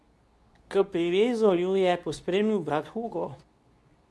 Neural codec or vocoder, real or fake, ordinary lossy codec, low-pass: codec, 24 kHz, 0.9 kbps, WavTokenizer, medium speech release version 2; fake; none; none